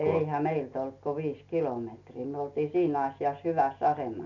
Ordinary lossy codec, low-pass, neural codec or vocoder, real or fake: none; 7.2 kHz; none; real